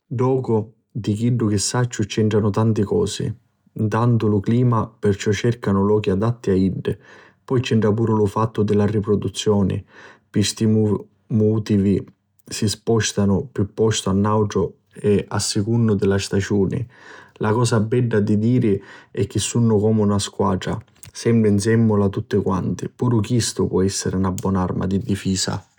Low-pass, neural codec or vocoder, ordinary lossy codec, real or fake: 19.8 kHz; none; none; real